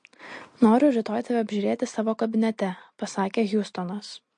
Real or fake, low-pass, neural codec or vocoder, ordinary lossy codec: real; 9.9 kHz; none; MP3, 48 kbps